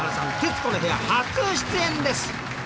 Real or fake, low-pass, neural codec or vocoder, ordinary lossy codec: real; none; none; none